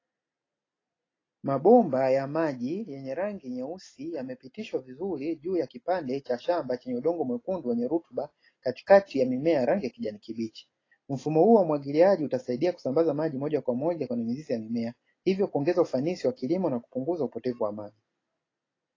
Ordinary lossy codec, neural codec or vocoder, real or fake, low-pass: AAC, 32 kbps; none; real; 7.2 kHz